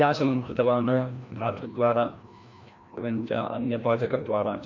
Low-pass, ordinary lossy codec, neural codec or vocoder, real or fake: 7.2 kHz; MP3, 48 kbps; codec, 16 kHz, 1 kbps, FreqCodec, larger model; fake